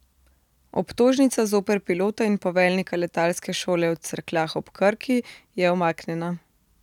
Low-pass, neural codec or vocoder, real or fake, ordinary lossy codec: 19.8 kHz; none; real; none